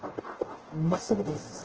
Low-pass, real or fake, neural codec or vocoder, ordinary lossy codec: 7.2 kHz; fake; codec, 44.1 kHz, 0.9 kbps, DAC; Opus, 16 kbps